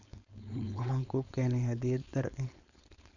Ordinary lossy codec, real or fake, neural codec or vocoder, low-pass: none; fake; codec, 16 kHz, 4.8 kbps, FACodec; 7.2 kHz